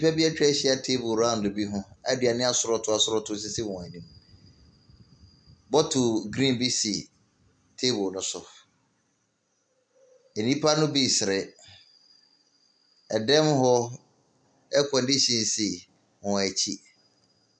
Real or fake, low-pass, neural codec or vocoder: real; 9.9 kHz; none